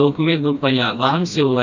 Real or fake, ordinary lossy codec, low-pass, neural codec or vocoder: fake; none; 7.2 kHz; codec, 16 kHz, 1 kbps, FreqCodec, smaller model